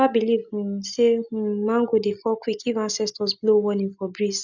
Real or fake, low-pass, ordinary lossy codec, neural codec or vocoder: real; 7.2 kHz; none; none